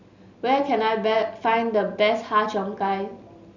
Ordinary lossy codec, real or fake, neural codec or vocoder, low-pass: none; real; none; 7.2 kHz